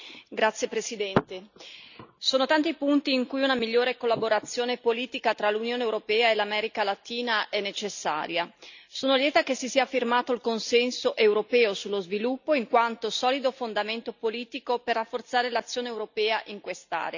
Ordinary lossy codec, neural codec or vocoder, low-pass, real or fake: none; none; 7.2 kHz; real